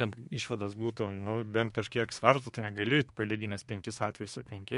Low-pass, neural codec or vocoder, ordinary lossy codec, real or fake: 10.8 kHz; codec, 24 kHz, 1 kbps, SNAC; MP3, 64 kbps; fake